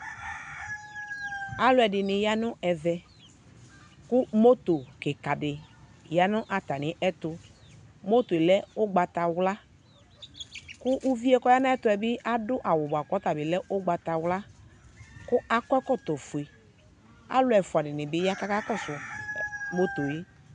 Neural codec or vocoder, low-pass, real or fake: none; 9.9 kHz; real